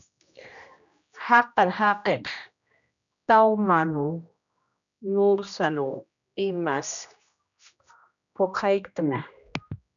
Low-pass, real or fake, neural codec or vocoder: 7.2 kHz; fake; codec, 16 kHz, 1 kbps, X-Codec, HuBERT features, trained on general audio